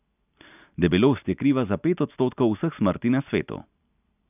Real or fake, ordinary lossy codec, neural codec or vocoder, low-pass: real; none; none; 3.6 kHz